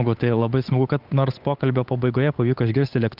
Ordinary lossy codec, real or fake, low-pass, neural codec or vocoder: Opus, 24 kbps; fake; 5.4 kHz; codec, 16 kHz, 8 kbps, FunCodec, trained on Chinese and English, 25 frames a second